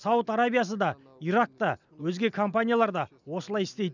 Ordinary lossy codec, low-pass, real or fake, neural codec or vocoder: none; 7.2 kHz; real; none